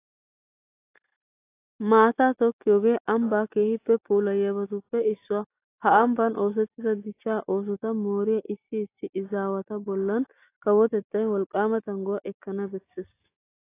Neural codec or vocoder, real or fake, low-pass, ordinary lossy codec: none; real; 3.6 kHz; AAC, 24 kbps